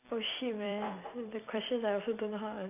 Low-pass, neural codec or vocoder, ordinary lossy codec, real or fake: 3.6 kHz; vocoder, 44.1 kHz, 128 mel bands every 256 samples, BigVGAN v2; none; fake